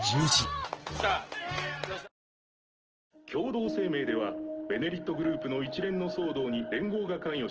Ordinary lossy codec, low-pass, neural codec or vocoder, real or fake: Opus, 16 kbps; 7.2 kHz; none; real